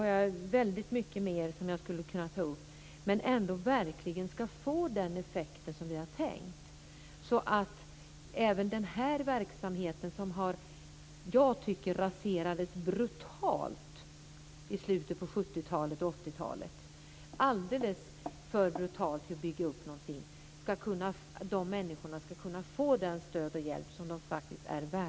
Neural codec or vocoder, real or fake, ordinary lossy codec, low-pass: none; real; none; none